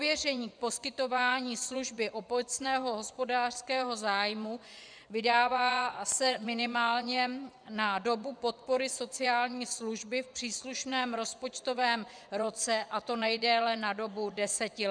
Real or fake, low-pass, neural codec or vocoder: fake; 9.9 kHz; vocoder, 24 kHz, 100 mel bands, Vocos